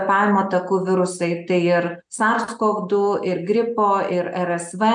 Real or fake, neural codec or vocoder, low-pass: real; none; 9.9 kHz